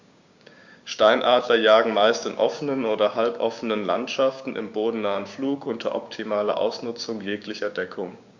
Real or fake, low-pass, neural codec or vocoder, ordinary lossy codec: fake; 7.2 kHz; codec, 16 kHz, 6 kbps, DAC; Opus, 64 kbps